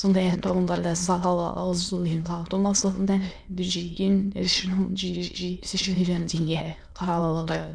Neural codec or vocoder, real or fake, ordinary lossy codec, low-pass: autoencoder, 22.05 kHz, a latent of 192 numbers a frame, VITS, trained on many speakers; fake; none; 9.9 kHz